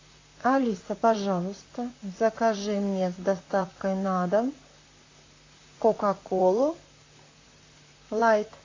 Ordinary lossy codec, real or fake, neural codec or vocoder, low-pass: AAC, 32 kbps; fake; vocoder, 44.1 kHz, 128 mel bands, Pupu-Vocoder; 7.2 kHz